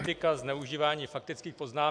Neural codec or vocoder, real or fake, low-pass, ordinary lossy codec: none; real; 9.9 kHz; AAC, 64 kbps